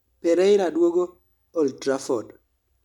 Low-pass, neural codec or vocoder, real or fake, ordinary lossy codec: 19.8 kHz; none; real; none